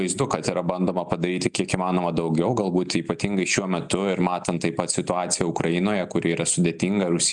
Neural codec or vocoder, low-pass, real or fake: none; 10.8 kHz; real